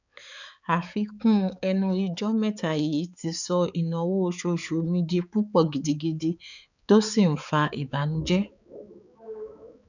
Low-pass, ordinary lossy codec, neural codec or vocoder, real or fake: 7.2 kHz; none; codec, 16 kHz, 4 kbps, X-Codec, HuBERT features, trained on balanced general audio; fake